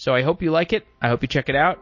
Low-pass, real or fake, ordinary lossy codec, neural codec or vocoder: 7.2 kHz; real; MP3, 32 kbps; none